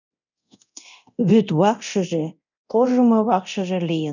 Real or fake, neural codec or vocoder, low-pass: fake; codec, 24 kHz, 0.9 kbps, DualCodec; 7.2 kHz